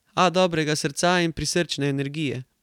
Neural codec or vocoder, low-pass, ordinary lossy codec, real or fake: none; 19.8 kHz; none; real